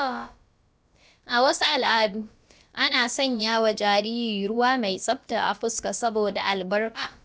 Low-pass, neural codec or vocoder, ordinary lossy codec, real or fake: none; codec, 16 kHz, about 1 kbps, DyCAST, with the encoder's durations; none; fake